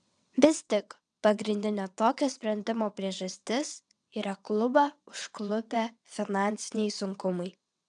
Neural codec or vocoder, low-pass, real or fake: vocoder, 22.05 kHz, 80 mel bands, WaveNeXt; 9.9 kHz; fake